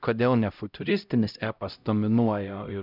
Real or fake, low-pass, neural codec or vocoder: fake; 5.4 kHz; codec, 16 kHz, 0.5 kbps, X-Codec, HuBERT features, trained on LibriSpeech